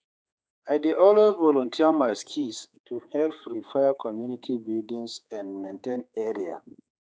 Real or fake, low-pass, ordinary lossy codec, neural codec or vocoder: fake; none; none; codec, 16 kHz, 4 kbps, X-Codec, HuBERT features, trained on general audio